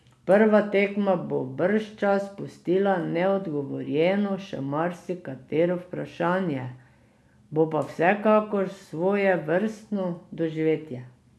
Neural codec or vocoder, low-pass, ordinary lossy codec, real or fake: none; none; none; real